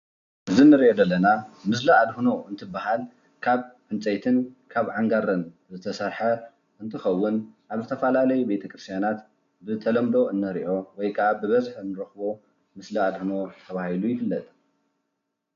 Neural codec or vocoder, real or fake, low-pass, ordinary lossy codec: none; real; 7.2 kHz; MP3, 64 kbps